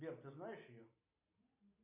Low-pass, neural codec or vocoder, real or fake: 3.6 kHz; none; real